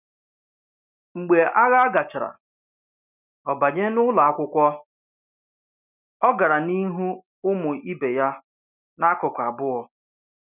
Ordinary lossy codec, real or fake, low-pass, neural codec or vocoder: none; real; 3.6 kHz; none